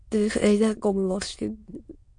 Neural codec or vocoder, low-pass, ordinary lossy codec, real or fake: autoencoder, 22.05 kHz, a latent of 192 numbers a frame, VITS, trained on many speakers; 9.9 kHz; MP3, 48 kbps; fake